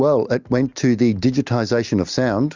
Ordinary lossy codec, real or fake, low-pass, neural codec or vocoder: Opus, 64 kbps; real; 7.2 kHz; none